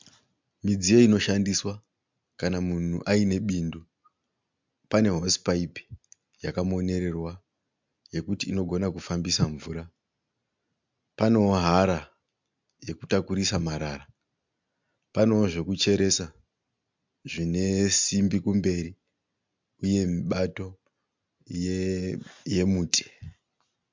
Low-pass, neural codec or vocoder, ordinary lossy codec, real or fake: 7.2 kHz; none; MP3, 64 kbps; real